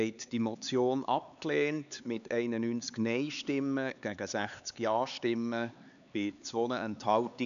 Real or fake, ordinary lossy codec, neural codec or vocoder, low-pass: fake; none; codec, 16 kHz, 4 kbps, X-Codec, HuBERT features, trained on LibriSpeech; 7.2 kHz